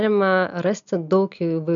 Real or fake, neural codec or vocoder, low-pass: real; none; 7.2 kHz